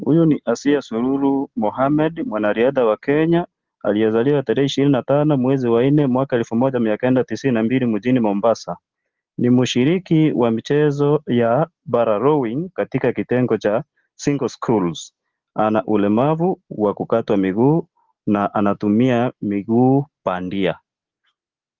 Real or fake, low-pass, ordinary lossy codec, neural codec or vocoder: real; 7.2 kHz; Opus, 16 kbps; none